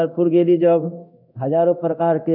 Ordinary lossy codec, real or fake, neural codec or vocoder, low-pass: none; fake; codec, 24 kHz, 0.9 kbps, DualCodec; 5.4 kHz